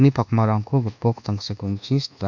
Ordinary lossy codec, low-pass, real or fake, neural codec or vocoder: none; 7.2 kHz; fake; codec, 24 kHz, 1.2 kbps, DualCodec